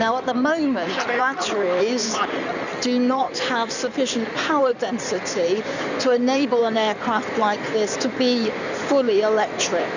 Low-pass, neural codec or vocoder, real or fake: 7.2 kHz; codec, 16 kHz in and 24 kHz out, 2.2 kbps, FireRedTTS-2 codec; fake